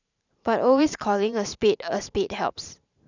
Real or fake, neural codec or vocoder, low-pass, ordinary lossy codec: fake; vocoder, 44.1 kHz, 128 mel bands every 512 samples, BigVGAN v2; 7.2 kHz; none